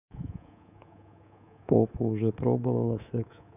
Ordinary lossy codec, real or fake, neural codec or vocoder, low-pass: none; fake; codec, 24 kHz, 3.1 kbps, DualCodec; 3.6 kHz